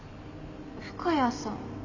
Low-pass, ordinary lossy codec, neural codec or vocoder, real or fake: 7.2 kHz; none; none; real